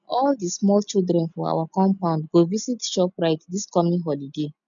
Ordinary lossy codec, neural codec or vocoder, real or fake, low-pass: none; none; real; 7.2 kHz